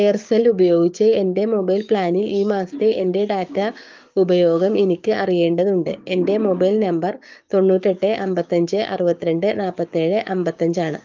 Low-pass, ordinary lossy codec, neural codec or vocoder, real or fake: 7.2 kHz; Opus, 32 kbps; codec, 16 kHz, 6 kbps, DAC; fake